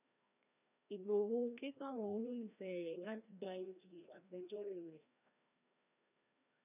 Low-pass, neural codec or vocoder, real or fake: 3.6 kHz; codec, 16 kHz, 1 kbps, FreqCodec, larger model; fake